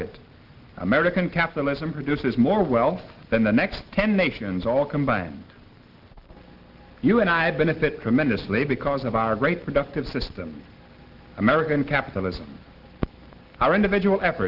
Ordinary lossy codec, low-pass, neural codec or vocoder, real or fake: Opus, 16 kbps; 5.4 kHz; none; real